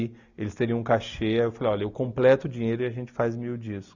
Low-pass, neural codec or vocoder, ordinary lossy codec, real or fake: 7.2 kHz; none; none; real